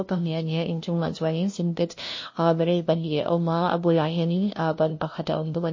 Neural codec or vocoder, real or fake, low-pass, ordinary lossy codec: codec, 16 kHz, 0.5 kbps, FunCodec, trained on LibriTTS, 25 frames a second; fake; 7.2 kHz; MP3, 32 kbps